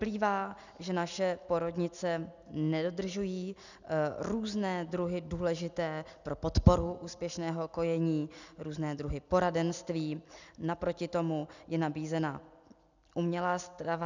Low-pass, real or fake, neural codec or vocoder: 7.2 kHz; real; none